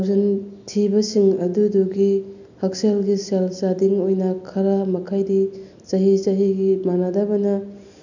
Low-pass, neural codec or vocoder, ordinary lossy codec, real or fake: 7.2 kHz; none; none; real